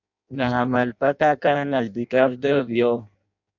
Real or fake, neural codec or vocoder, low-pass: fake; codec, 16 kHz in and 24 kHz out, 0.6 kbps, FireRedTTS-2 codec; 7.2 kHz